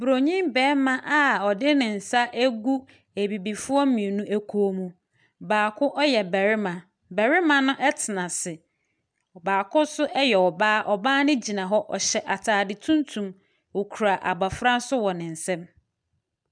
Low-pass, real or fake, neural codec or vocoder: 9.9 kHz; real; none